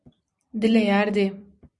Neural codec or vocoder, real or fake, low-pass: vocoder, 44.1 kHz, 128 mel bands every 256 samples, BigVGAN v2; fake; 10.8 kHz